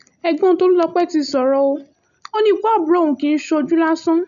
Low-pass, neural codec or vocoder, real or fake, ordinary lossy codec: 7.2 kHz; none; real; none